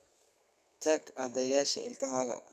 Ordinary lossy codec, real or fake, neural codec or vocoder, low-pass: none; fake; codec, 32 kHz, 1.9 kbps, SNAC; 14.4 kHz